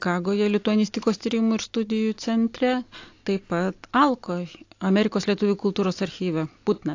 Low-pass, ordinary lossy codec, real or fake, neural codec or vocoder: 7.2 kHz; AAC, 48 kbps; real; none